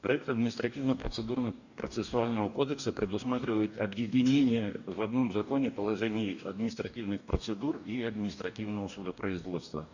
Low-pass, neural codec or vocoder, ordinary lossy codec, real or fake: 7.2 kHz; codec, 44.1 kHz, 2.6 kbps, DAC; none; fake